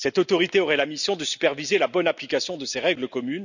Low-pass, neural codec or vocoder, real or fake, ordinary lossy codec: 7.2 kHz; none; real; none